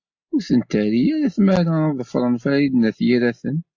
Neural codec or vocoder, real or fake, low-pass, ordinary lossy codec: none; real; 7.2 kHz; AAC, 48 kbps